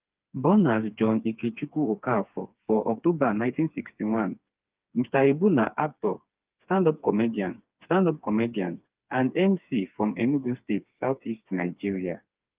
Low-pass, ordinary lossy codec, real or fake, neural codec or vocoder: 3.6 kHz; Opus, 24 kbps; fake; codec, 16 kHz, 4 kbps, FreqCodec, smaller model